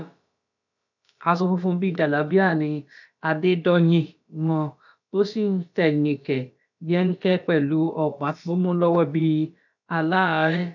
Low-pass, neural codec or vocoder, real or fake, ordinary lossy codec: 7.2 kHz; codec, 16 kHz, about 1 kbps, DyCAST, with the encoder's durations; fake; none